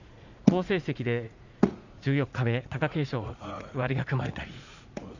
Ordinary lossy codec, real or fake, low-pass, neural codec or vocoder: none; fake; 7.2 kHz; vocoder, 44.1 kHz, 80 mel bands, Vocos